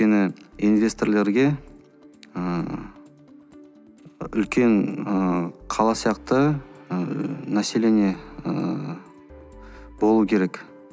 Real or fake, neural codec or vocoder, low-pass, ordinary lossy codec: real; none; none; none